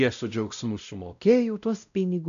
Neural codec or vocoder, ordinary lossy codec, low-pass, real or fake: codec, 16 kHz, 0.5 kbps, X-Codec, WavLM features, trained on Multilingual LibriSpeech; MP3, 96 kbps; 7.2 kHz; fake